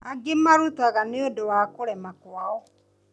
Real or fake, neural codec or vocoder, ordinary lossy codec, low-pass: real; none; none; none